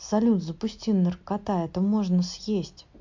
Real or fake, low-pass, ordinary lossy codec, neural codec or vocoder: real; 7.2 kHz; MP3, 48 kbps; none